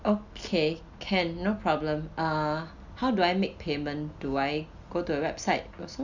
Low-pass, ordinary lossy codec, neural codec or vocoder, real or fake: 7.2 kHz; Opus, 64 kbps; none; real